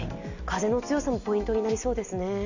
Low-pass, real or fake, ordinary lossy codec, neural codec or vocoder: 7.2 kHz; real; none; none